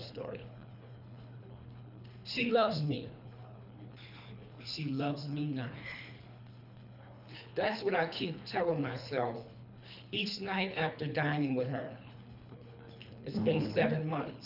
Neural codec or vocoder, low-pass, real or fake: codec, 24 kHz, 3 kbps, HILCodec; 5.4 kHz; fake